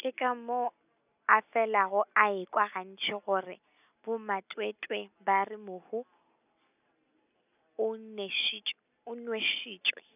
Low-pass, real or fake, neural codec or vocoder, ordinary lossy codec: 3.6 kHz; real; none; none